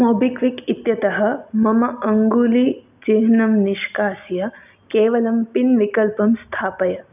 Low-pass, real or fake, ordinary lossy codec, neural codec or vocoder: 3.6 kHz; real; none; none